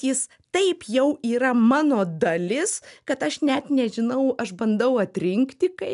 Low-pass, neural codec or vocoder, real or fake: 10.8 kHz; none; real